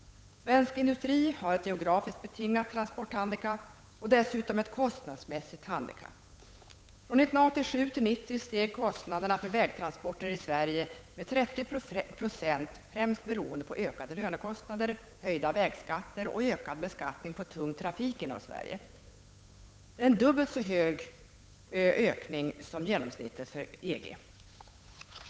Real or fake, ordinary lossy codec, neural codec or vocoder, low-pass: fake; none; codec, 16 kHz, 8 kbps, FunCodec, trained on Chinese and English, 25 frames a second; none